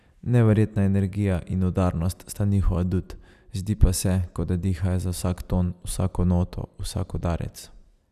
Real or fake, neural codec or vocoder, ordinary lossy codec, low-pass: real; none; none; 14.4 kHz